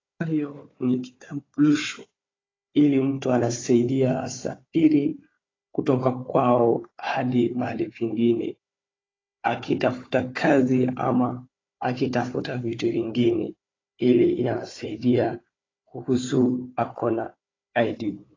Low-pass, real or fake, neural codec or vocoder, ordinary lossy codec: 7.2 kHz; fake; codec, 16 kHz, 4 kbps, FunCodec, trained on Chinese and English, 50 frames a second; AAC, 32 kbps